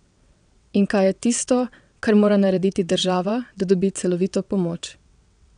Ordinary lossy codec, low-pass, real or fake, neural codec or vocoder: none; 9.9 kHz; fake; vocoder, 22.05 kHz, 80 mel bands, WaveNeXt